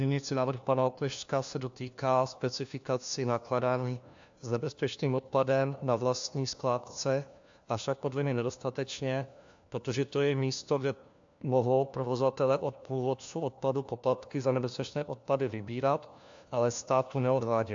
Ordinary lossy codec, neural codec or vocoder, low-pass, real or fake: AAC, 64 kbps; codec, 16 kHz, 1 kbps, FunCodec, trained on LibriTTS, 50 frames a second; 7.2 kHz; fake